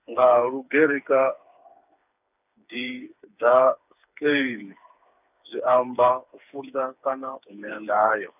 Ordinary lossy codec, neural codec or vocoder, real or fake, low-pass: none; codec, 16 kHz, 4 kbps, FreqCodec, smaller model; fake; 3.6 kHz